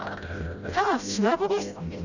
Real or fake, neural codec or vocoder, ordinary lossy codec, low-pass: fake; codec, 16 kHz, 0.5 kbps, FreqCodec, smaller model; none; 7.2 kHz